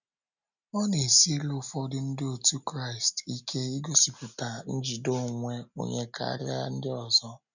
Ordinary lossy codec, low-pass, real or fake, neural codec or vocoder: none; 7.2 kHz; real; none